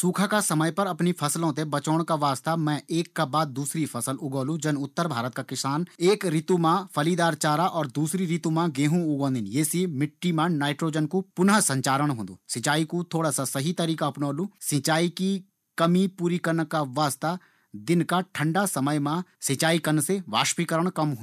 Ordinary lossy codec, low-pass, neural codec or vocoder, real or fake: none; 14.4 kHz; none; real